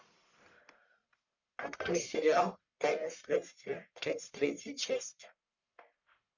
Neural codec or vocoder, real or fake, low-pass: codec, 44.1 kHz, 1.7 kbps, Pupu-Codec; fake; 7.2 kHz